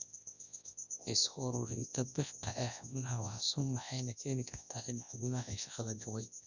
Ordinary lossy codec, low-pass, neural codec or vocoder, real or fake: none; 7.2 kHz; codec, 24 kHz, 0.9 kbps, WavTokenizer, large speech release; fake